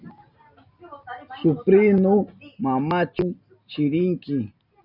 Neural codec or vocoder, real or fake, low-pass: none; real; 5.4 kHz